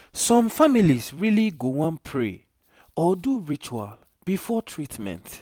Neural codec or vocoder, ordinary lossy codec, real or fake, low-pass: vocoder, 44.1 kHz, 128 mel bands every 256 samples, BigVGAN v2; none; fake; 19.8 kHz